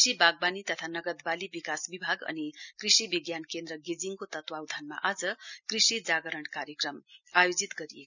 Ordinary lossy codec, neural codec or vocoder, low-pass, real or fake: none; none; 7.2 kHz; real